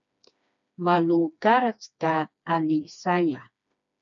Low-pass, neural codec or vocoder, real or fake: 7.2 kHz; codec, 16 kHz, 2 kbps, FreqCodec, smaller model; fake